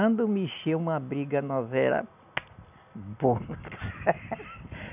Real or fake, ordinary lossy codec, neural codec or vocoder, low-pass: real; none; none; 3.6 kHz